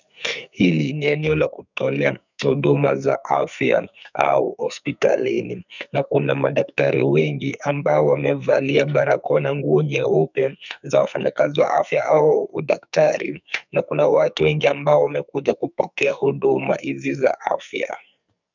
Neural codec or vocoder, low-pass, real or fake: codec, 44.1 kHz, 2.6 kbps, SNAC; 7.2 kHz; fake